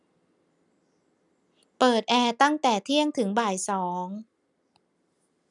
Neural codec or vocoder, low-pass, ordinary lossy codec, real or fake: none; 10.8 kHz; none; real